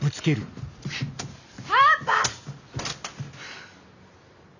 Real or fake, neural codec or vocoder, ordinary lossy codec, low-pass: real; none; none; 7.2 kHz